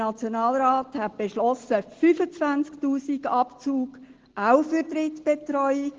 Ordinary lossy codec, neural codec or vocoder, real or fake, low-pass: Opus, 16 kbps; none; real; 7.2 kHz